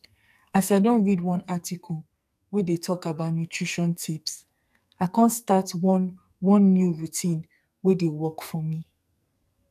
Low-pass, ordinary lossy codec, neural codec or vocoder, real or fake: 14.4 kHz; none; codec, 44.1 kHz, 2.6 kbps, SNAC; fake